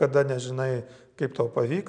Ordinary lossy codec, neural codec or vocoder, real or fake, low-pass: MP3, 96 kbps; none; real; 9.9 kHz